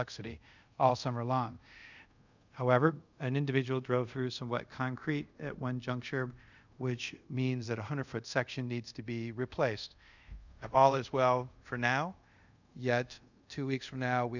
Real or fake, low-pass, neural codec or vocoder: fake; 7.2 kHz; codec, 24 kHz, 0.5 kbps, DualCodec